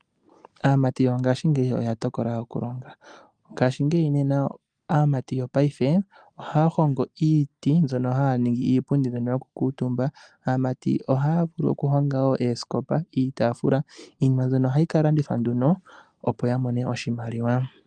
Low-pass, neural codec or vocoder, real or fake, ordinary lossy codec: 9.9 kHz; none; real; Opus, 32 kbps